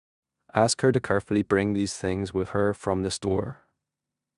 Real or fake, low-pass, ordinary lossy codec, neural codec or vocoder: fake; 10.8 kHz; none; codec, 16 kHz in and 24 kHz out, 0.9 kbps, LongCat-Audio-Codec, four codebook decoder